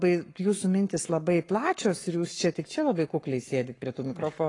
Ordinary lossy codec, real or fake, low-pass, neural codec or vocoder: AAC, 32 kbps; fake; 10.8 kHz; codec, 44.1 kHz, 7.8 kbps, Pupu-Codec